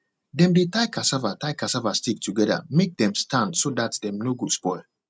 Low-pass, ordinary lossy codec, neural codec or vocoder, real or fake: none; none; none; real